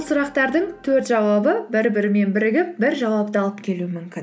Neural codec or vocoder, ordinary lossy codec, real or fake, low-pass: none; none; real; none